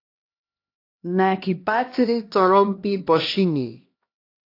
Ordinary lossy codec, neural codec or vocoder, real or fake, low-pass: AAC, 32 kbps; codec, 16 kHz, 1 kbps, X-Codec, HuBERT features, trained on LibriSpeech; fake; 5.4 kHz